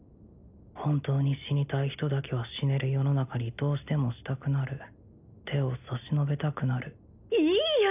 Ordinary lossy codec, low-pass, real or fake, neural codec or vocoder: none; 3.6 kHz; real; none